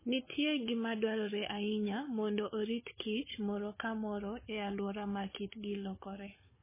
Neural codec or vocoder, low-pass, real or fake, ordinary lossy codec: codec, 16 kHz, 16 kbps, FunCodec, trained on Chinese and English, 50 frames a second; 3.6 kHz; fake; MP3, 16 kbps